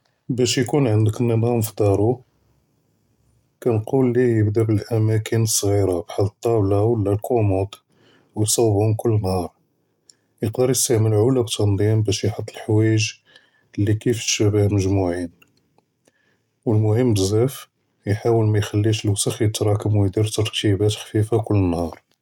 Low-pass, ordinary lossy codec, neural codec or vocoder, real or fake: 19.8 kHz; none; none; real